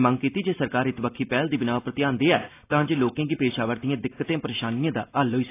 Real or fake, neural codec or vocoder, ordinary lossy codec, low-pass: real; none; AAC, 24 kbps; 3.6 kHz